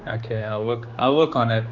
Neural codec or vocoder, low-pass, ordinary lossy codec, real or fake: codec, 16 kHz, 2 kbps, X-Codec, HuBERT features, trained on general audio; 7.2 kHz; none; fake